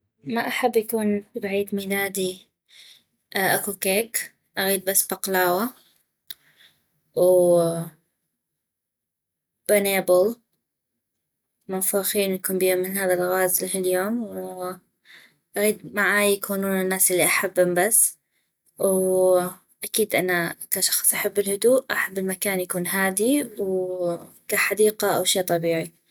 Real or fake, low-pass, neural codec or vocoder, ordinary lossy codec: real; none; none; none